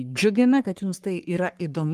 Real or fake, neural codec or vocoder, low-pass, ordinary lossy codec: fake; codec, 44.1 kHz, 3.4 kbps, Pupu-Codec; 14.4 kHz; Opus, 32 kbps